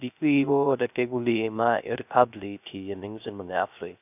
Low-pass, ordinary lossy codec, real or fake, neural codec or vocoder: 3.6 kHz; none; fake; codec, 16 kHz, 0.3 kbps, FocalCodec